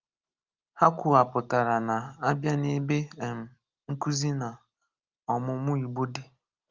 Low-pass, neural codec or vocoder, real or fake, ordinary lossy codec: 7.2 kHz; none; real; Opus, 32 kbps